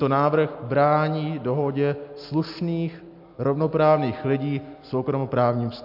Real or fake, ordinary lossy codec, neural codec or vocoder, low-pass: real; MP3, 48 kbps; none; 5.4 kHz